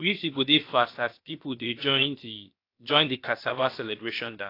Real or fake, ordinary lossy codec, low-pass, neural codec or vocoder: fake; AAC, 32 kbps; 5.4 kHz; codec, 16 kHz, 0.7 kbps, FocalCodec